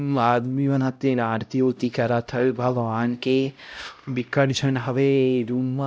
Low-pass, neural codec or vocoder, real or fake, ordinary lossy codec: none; codec, 16 kHz, 0.5 kbps, X-Codec, HuBERT features, trained on LibriSpeech; fake; none